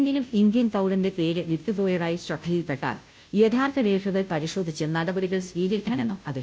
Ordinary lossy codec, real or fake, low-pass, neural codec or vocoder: none; fake; none; codec, 16 kHz, 0.5 kbps, FunCodec, trained on Chinese and English, 25 frames a second